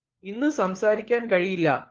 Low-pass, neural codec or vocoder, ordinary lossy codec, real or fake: 7.2 kHz; codec, 16 kHz, 4 kbps, FunCodec, trained on LibriTTS, 50 frames a second; Opus, 16 kbps; fake